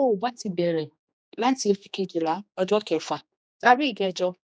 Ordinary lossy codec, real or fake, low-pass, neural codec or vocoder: none; fake; none; codec, 16 kHz, 2 kbps, X-Codec, HuBERT features, trained on general audio